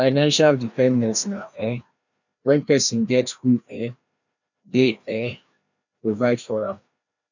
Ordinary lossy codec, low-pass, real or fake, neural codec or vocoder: none; 7.2 kHz; fake; codec, 16 kHz, 1 kbps, FreqCodec, larger model